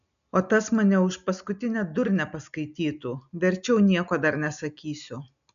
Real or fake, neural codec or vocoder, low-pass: real; none; 7.2 kHz